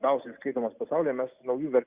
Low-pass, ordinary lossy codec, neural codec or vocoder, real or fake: 3.6 kHz; Opus, 64 kbps; none; real